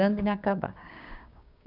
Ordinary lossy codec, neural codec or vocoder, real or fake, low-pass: none; codec, 16 kHz, 6 kbps, DAC; fake; 5.4 kHz